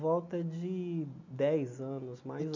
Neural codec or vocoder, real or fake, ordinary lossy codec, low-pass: none; real; none; 7.2 kHz